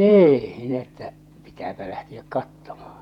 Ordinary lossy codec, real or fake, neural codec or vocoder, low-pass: none; fake; vocoder, 48 kHz, 128 mel bands, Vocos; 19.8 kHz